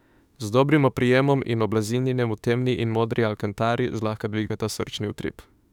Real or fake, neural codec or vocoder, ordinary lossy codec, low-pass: fake; autoencoder, 48 kHz, 32 numbers a frame, DAC-VAE, trained on Japanese speech; none; 19.8 kHz